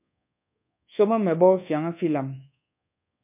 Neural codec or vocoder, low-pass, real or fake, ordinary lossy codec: codec, 24 kHz, 1.2 kbps, DualCodec; 3.6 kHz; fake; MP3, 32 kbps